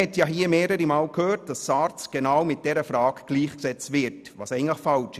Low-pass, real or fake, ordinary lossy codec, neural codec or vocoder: 14.4 kHz; real; none; none